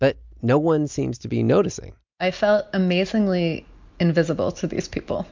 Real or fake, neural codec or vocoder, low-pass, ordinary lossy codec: real; none; 7.2 kHz; MP3, 64 kbps